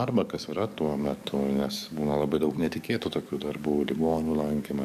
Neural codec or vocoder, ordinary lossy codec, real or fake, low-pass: codec, 44.1 kHz, 7.8 kbps, DAC; AAC, 96 kbps; fake; 14.4 kHz